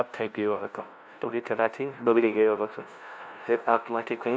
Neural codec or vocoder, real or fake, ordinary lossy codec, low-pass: codec, 16 kHz, 0.5 kbps, FunCodec, trained on LibriTTS, 25 frames a second; fake; none; none